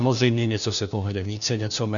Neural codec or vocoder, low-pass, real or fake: codec, 16 kHz, 1 kbps, FunCodec, trained on LibriTTS, 50 frames a second; 7.2 kHz; fake